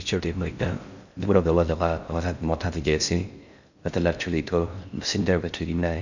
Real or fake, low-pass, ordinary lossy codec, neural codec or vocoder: fake; 7.2 kHz; none; codec, 16 kHz in and 24 kHz out, 0.6 kbps, FocalCodec, streaming, 2048 codes